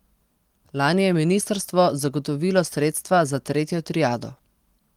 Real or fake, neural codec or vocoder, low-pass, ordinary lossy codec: real; none; 19.8 kHz; Opus, 24 kbps